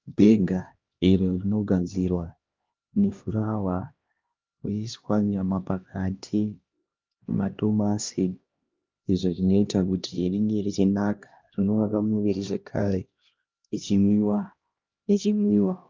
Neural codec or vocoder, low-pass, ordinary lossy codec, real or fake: codec, 16 kHz, 1 kbps, X-Codec, HuBERT features, trained on LibriSpeech; 7.2 kHz; Opus, 24 kbps; fake